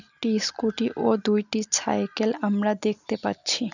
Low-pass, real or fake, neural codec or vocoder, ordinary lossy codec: 7.2 kHz; real; none; none